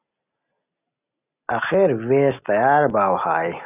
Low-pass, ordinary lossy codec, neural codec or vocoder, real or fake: 3.6 kHz; AAC, 32 kbps; none; real